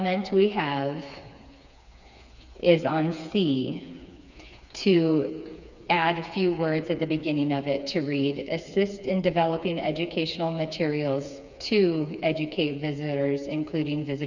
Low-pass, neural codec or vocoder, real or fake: 7.2 kHz; codec, 16 kHz, 4 kbps, FreqCodec, smaller model; fake